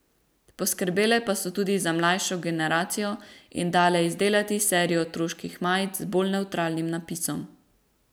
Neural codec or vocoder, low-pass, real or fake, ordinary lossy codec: none; none; real; none